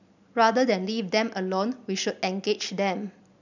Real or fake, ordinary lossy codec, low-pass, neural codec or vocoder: real; none; 7.2 kHz; none